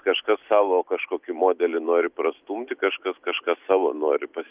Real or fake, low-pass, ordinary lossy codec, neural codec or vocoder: real; 3.6 kHz; Opus, 24 kbps; none